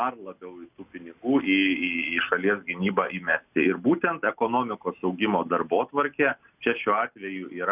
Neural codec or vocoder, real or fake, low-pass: none; real; 3.6 kHz